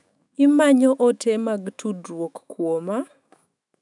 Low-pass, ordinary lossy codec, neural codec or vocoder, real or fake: 10.8 kHz; none; autoencoder, 48 kHz, 128 numbers a frame, DAC-VAE, trained on Japanese speech; fake